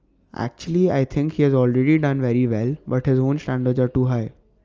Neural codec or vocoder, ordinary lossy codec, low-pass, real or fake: none; Opus, 24 kbps; 7.2 kHz; real